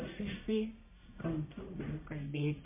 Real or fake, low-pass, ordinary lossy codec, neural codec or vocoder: fake; 3.6 kHz; MP3, 24 kbps; codec, 44.1 kHz, 1.7 kbps, Pupu-Codec